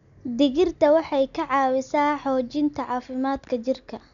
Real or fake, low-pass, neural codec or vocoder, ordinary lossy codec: real; 7.2 kHz; none; none